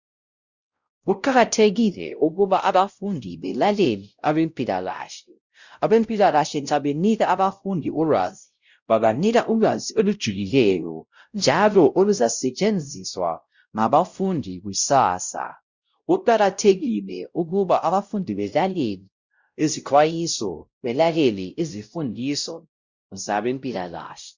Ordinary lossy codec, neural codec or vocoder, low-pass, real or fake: Opus, 64 kbps; codec, 16 kHz, 0.5 kbps, X-Codec, WavLM features, trained on Multilingual LibriSpeech; 7.2 kHz; fake